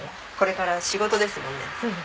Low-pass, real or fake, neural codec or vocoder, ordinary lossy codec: none; real; none; none